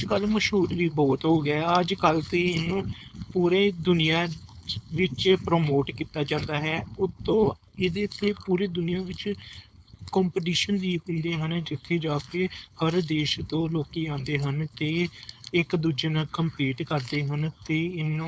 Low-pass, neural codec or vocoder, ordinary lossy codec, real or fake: none; codec, 16 kHz, 4.8 kbps, FACodec; none; fake